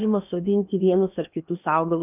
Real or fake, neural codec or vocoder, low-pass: fake; codec, 16 kHz in and 24 kHz out, 0.8 kbps, FocalCodec, streaming, 65536 codes; 3.6 kHz